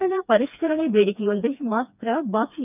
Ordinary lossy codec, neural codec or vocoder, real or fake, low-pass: none; codec, 16 kHz, 2 kbps, FreqCodec, smaller model; fake; 3.6 kHz